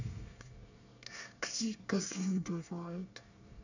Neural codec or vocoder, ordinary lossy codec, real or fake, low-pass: codec, 24 kHz, 1 kbps, SNAC; none; fake; 7.2 kHz